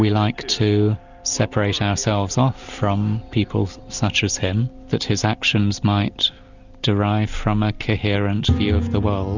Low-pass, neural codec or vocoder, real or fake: 7.2 kHz; none; real